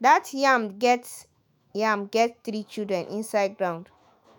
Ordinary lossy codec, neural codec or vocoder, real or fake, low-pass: none; autoencoder, 48 kHz, 128 numbers a frame, DAC-VAE, trained on Japanese speech; fake; none